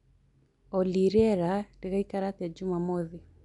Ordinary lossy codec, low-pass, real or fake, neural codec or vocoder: none; 10.8 kHz; real; none